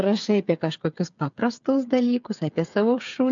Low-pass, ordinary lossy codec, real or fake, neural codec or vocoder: 7.2 kHz; MP3, 64 kbps; fake; codec, 16 kHz, 8 kbps, FreqCodec, smaller model